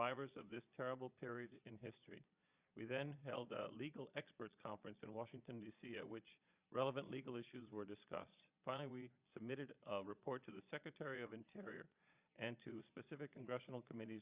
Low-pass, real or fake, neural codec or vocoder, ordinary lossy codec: 3.6 kHz; fake; vocoder, 22.05 kHz, 80 mel bands, Vocos; Opus, 64 kbps